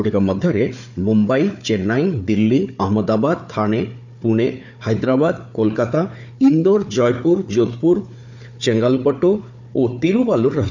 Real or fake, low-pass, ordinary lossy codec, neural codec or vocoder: fake; 7.2 kHz; none; codec, 16 kHz, 4 kbps, FunCodec, trained on Chinese and English, 50 frames a second